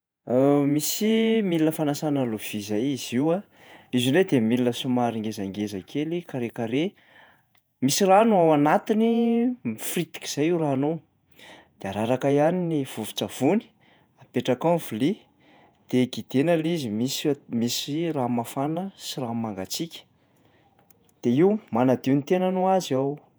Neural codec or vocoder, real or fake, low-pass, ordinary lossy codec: vocoder, 48 kHz, 128 mel bands, Vocos; fake; none; none